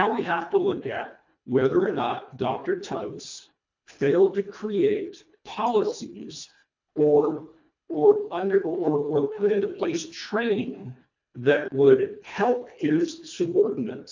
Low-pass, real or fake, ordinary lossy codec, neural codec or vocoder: 7.2 kHz; fake; MP3, 64 kbps; codec, 24 kHz, 1.5 kbps, HILCodec